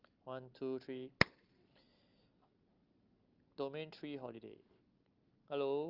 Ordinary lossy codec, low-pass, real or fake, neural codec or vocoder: Opus, 32 kbps; 5.4 kHz; real; none